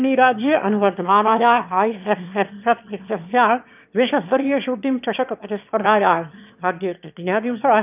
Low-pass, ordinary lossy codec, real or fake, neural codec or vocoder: 3.6 kHz; none; fake; autoencoder, 22.05 kHz, a latent of 192 numbers a frame, VITS, trained on one speaker